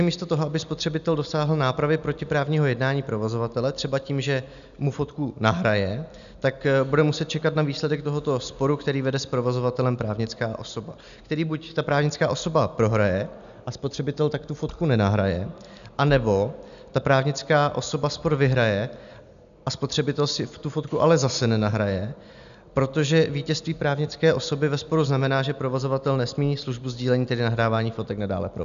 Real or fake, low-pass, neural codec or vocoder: real; 7.2 kHz; none